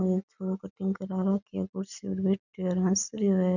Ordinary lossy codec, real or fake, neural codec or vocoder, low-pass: none; real; none; 7.2 kHz